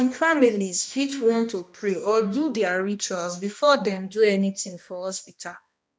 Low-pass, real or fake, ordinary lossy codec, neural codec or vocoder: none; fake; none; codec, 16 kHz, 1 kbps, X-Codec, HuBERT features, trained on balanced general audio